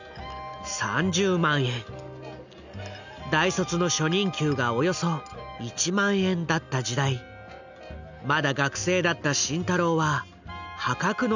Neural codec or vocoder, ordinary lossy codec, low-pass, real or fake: none; none; 7.2 kHz; real